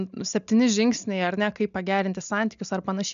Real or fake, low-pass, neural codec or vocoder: real; 7.2 kHz; none